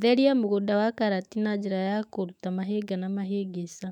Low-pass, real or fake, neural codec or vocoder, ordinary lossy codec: 19.8 kHz; fake; autoencoder, 48 kHz, 128 numbers a frame, DAC-VAE, trained on Japanese speech; none